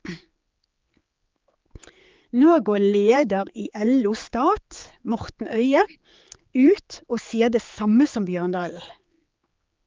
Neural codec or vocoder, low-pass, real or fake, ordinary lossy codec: codec, 16 kHz, 4 kbps, X-Codec, HuBERT features, trained on general audio; 7.2 kHz; fake; Opus, 24 kbps